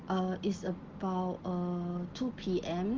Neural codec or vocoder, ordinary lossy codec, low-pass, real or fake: none; Opus, 16 kbps; 7.2 kHz; real